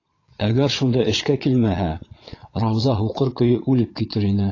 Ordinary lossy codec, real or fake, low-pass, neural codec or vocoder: AAC, 32 kbps; fake; 7.2 kHz; vocoder, 22.05 kHz, 80 mel bands, Vocos